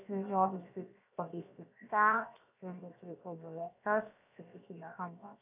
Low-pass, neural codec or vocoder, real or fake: 3.6 kHz; codec, 16 kHz, 0.7 kbps, FocalCodec; fake